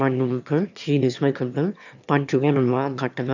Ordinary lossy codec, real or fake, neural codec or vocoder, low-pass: none; fake; autoencoder, 22.05 kHz, a latent of 192 numbers a frame, VITS, trained on one speaker; 7.2 kHz